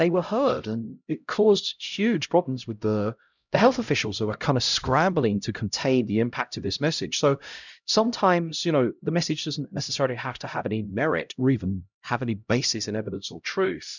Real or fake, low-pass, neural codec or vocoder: fake; 7.2 kHz; codec, 16 kHz, 0.5 kbps, X-Codec, HuBERT features, trained on LibriSpeech